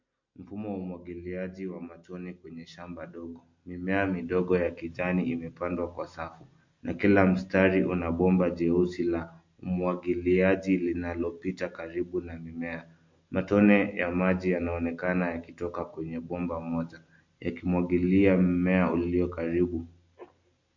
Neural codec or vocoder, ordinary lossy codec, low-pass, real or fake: none; MP3, 48 kbps; 7.2 kHz; real